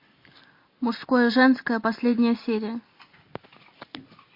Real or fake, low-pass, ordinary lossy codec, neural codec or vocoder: real; 5.4 kHz; MP3, 32 kbps; none